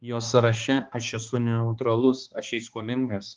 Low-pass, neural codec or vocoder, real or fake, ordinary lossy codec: 7.2 kHz; codec, 16 kHz, 2 kbps, X-Codec, HuBERT features, trained on balanced general audio; fake; Opus, 32 kbps